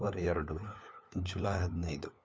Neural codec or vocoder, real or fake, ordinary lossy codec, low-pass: codec, 16 kHz, 4 kbps, FunCodec, trained on LibriTTS, 50 frames a second; fake; none; none